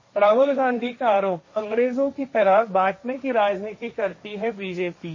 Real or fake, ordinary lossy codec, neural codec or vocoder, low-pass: fake; MP3, 32 kbps; codec, 16 kHz, 1.1 kbps, Voila-Tokenizer; 7.2 kHz